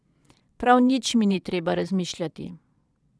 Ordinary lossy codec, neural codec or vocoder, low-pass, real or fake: none; vocoder, 22.05 kHz, 80 mel bands, Vocos; none; fake